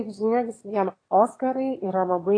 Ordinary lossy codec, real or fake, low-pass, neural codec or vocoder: AAC, 32 kbps; fake; 9.9 kHz; autoencoder, 22.05 kHz, a latent of 192 numbers a frame, VITS, trained on one speaker